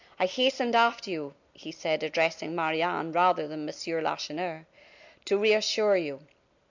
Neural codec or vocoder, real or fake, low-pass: none; real; 7.2 kHz